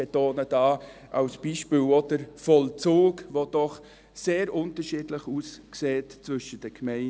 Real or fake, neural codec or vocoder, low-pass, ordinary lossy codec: real; none; none; none